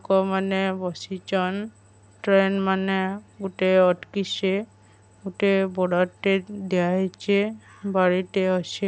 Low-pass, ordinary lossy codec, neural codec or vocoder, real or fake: none; none; none; real